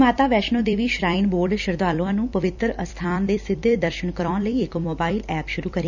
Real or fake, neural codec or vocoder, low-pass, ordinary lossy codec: fake; vocoder, 44.1 kHz, 128 mel bands every 256 samples, BigVGAN v2; 7.2 kHz; none